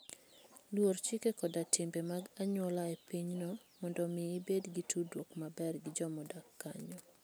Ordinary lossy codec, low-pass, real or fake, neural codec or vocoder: none; none; real; none